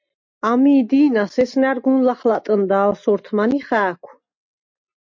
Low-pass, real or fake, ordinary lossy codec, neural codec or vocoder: 7.2 kHz; real; MP3, 48 kbps; none